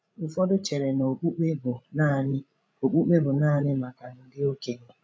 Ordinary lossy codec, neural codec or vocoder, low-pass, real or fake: none; codec, 16 kHz, 16 kbps, FreqCodec, larger model; none; fake